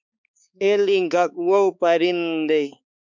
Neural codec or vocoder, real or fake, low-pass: codec, 16 kHz, 4 kbps, X-Codec, HuBERT features, trained on balanced general audio; fake; 7.2 kHz